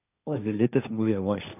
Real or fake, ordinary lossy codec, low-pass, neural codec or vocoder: fake; none; 3.6 kHz; codec, 16 kHz, 1.1 kbps, Voila-Tokenizer